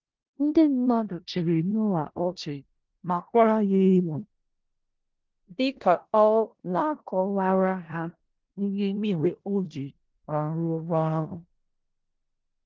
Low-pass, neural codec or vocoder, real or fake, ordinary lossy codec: 7.2 kHz; codec, 16 kHz in and 24 kHz out, 0.4 kbps, LongCat-Audio-Codec, four codebook decoder; fake; Opus, 16 kbps